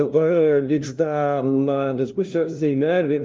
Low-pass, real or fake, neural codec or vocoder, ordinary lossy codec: 7.2 kHz; fake; codec, 16 kHz, 0.5 kbps, FunCodec, trained on LibriTTS, 25 frames a second; Opus, 32 kbps